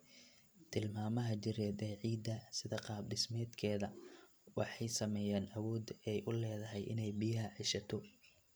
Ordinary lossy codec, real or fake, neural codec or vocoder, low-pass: none; real; none; none